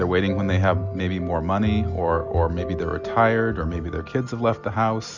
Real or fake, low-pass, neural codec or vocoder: real; 7.2 kHz; none